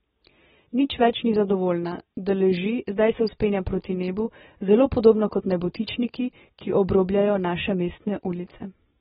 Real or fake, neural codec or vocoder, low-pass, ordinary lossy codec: real; none; 7.2 kHz; AAC, 16 kbps